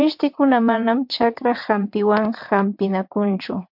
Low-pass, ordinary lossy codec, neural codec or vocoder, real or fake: 5.4 kHz; MP3, 48 kbps; vocoder, 22.05 kHz, 80 mel bands, WaveNeXt; fake